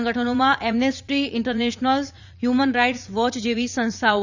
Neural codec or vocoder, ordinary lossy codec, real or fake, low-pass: vocoder, 44.1 kHz, 80 mel bands, Vocos; none; fake; 7.2 kHz